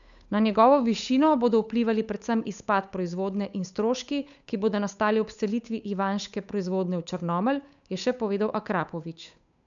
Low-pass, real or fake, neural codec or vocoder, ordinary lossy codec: 7.2 kHz; fake; codec, 16 kHz, 8 kbps, FunCodec, trained on Chinese and English, 25 frames a second; none